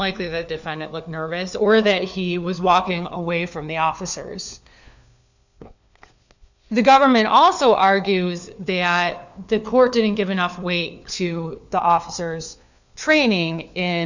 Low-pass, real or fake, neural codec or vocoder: 7.2 kHz; fake; codec, 16 kHz, 4 kbps, FunCodec, trained on LibriTTS, 50 frames a second